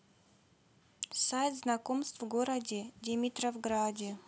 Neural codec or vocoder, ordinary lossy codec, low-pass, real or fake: none; none; none; real